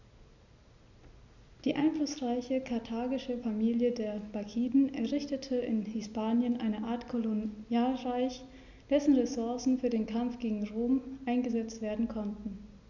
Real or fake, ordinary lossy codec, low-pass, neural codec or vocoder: real; none; 7.2 kHz; none